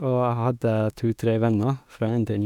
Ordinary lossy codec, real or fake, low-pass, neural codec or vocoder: none; fake; 19.8 kHz; autoencoder, 48 kHz, 32 numbers a frame, DAC-VAE, trained on Japanese speech